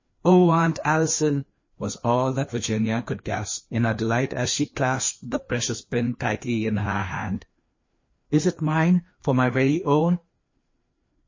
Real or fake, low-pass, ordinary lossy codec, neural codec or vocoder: fake; 7.2 kHz; MP3, 32 kbps; codec, 16 kHz, 2 kbps, FreqCodec, larger model